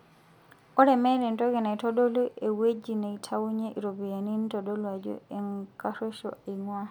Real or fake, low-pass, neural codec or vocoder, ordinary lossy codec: real; 19.8 kHz; none; none